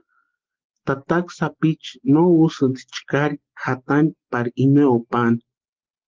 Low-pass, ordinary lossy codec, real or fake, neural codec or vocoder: 7.2 kHz; Opus, 16 kbps; real; none